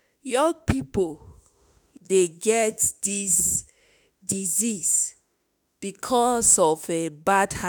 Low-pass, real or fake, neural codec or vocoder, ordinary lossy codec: none; fake; autoencoder, 48 kHz, 32 numbers a frame, DAC-VAE, trained on Japanese speech; none